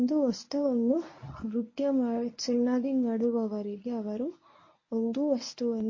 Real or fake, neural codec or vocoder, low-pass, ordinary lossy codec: fake; codec, 24 kHz, 0.9 kbps, WavTokenizer, medium speech release version 1; 7.2 kHz; MP3, 32 kbps